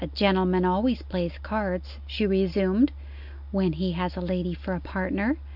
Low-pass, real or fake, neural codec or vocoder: 5.4 kHz; real; none